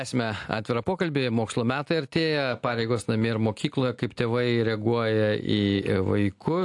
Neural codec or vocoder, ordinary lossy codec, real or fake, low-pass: none; AAC, 64 kbps; real; 10.8 kHz